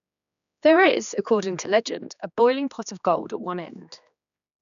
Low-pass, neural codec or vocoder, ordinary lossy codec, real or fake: 7.2 kHz; codec, 16 kHz, 2 kbps, X-Codec, HuBERT features, trained on general audio; none; fake